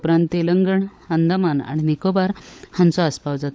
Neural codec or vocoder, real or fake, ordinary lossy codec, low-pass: codec, 16 kHz, 16 kbps, FunCodec, trained on LibriTTS, 50 frames a second; fake; none; none